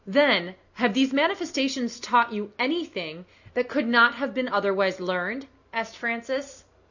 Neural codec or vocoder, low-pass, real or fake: none; 7.2 kHz; real